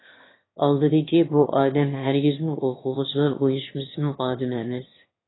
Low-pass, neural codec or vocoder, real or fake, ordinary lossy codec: 7.2 kHz; autoencoder, 22.05 kHz, a latent of 192 numbers a frame, VITS, trained on one speaker; fake; AAC, 16 kbps